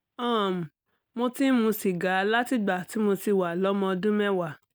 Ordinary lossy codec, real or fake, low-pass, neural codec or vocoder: none; real; none; none